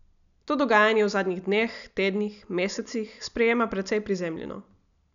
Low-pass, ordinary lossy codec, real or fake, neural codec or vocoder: 7.2 kHz; none; real; none